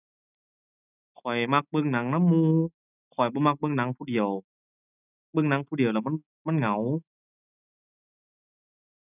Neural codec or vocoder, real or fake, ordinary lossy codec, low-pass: none; real; none; 3.6 kHz